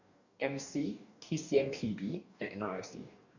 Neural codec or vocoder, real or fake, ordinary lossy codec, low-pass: codec, 44.1 kHz, 2.6 kbps, DAC; fake; none; 7.2 kHz